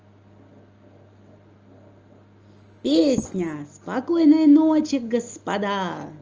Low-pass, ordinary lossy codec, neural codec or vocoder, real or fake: 7.2 kHz; Opus, 24 kbps; none; real